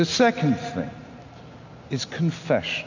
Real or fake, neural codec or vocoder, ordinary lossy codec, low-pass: real; none; AAC, 48 kbps; 7.2 kHz